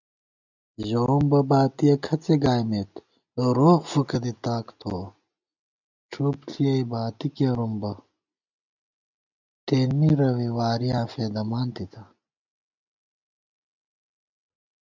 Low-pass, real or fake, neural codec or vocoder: 7.2 kHz; real; none